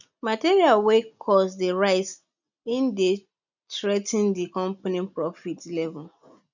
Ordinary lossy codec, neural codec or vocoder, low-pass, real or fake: none; none; 7.2 kHz; real